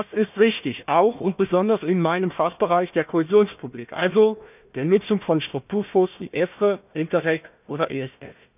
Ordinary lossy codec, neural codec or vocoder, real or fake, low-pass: none; codec, 16 kHz, 1 kbps, FunCodec, trained on Chinese and English, 50 frames a second; fake; 3.6 kHz